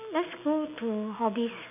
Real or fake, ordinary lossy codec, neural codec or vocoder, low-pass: real; none; none; 3.6 kHz